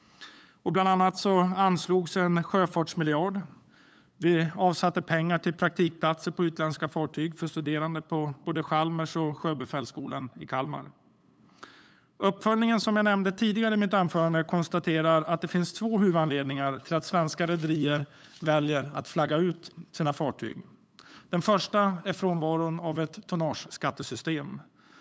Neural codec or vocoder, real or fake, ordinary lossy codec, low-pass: codec, 16 kHz, 8 kbps, FunCodec, trained on LibriTTS, 25 frames a second; fake; none; none